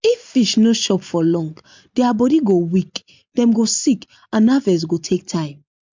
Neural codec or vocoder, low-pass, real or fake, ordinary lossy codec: none; 7.2 kHz; real; MP3, 64 kbps